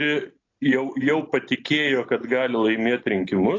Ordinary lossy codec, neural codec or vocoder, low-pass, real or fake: AAC, 32 kbps; none; 7.2 kHz; real